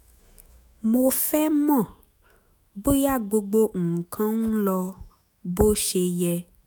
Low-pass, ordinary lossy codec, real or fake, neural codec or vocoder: none; none; fake; autoencoder, 48 kHz, 128 numbers a frame, DAC-VAE, trained on Japanese speech